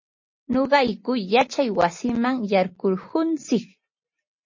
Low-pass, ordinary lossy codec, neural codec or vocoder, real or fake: 7.2 kHz; MP3, 32 kbps; none; real